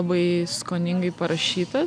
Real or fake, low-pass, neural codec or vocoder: real; 9.9 kHz; none